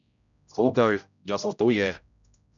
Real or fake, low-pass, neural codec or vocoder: fake; 7.2 kHz; codec, 16 kHz, 0.5 kbps, X-Codec, HuBERT features, trained on general audio